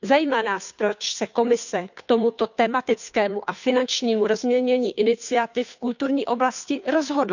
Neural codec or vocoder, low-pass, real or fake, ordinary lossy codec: codec, 16 kHz, 2 kbps, FunCodec, trained on Chinese and English, 25 frames a second; 7.2 kHz; fake; none